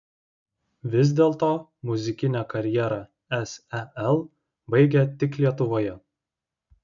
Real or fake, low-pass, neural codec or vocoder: real; 7.2 kHz; none